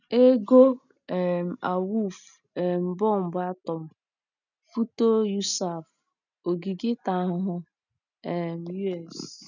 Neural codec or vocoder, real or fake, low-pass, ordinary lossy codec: none; real; 7.2 kHz; none